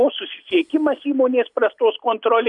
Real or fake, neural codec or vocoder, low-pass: real; none; 10.8 kHz